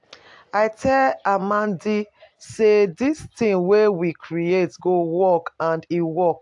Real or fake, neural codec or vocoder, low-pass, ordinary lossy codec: real; none; 10.8 kHz; AAC, 64 kbps